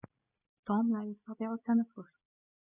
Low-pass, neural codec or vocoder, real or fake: 3.6 kHz; none; real